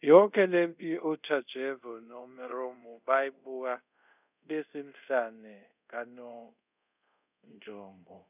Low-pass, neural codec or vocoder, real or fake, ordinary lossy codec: 3.6 kHz; codec, 24 kHz, 0.5 kbps, DualCodec; fake; none